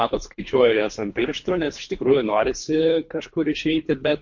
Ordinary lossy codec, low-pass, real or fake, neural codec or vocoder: MP3, 48 kbps; 7.2 kHz; fake; codec, 24 kHz, 3 kbps, HILCodec